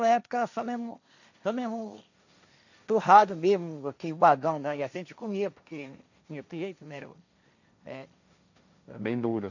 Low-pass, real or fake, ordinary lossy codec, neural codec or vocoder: 7.2 kHz; fake; none; codec, 16 kHz, 1.1 kbps, Voila-Tokenizer